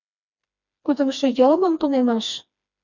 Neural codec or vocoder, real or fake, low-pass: codec, 16 kHz, 2 kbps, FreqCodec, smaller model; fake; 7.2 kHz